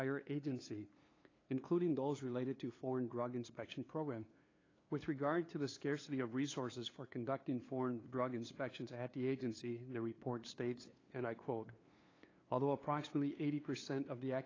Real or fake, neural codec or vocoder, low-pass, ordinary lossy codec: fake; codec, 16 kHz, 2 kbps, FunCodec, trained on LibriTTS, 25 frames a second; 7.2 kHz; AAC, 32 kbps